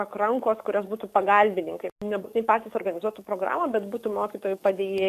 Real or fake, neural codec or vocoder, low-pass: fake; codec, 44.1 kHz, 7.8 kbps, DAC; 14.4 kHz